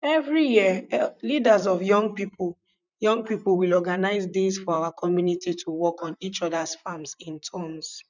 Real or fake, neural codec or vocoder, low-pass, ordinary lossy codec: fake; vocoder, 44.1 kHz, 128 mel bands, Pupu-Vocoder; 7.2 kHz; none